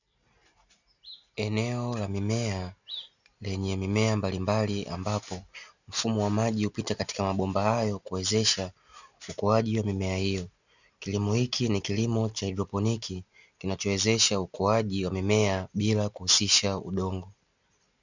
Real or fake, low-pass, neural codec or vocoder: real; 7.2 kHz; none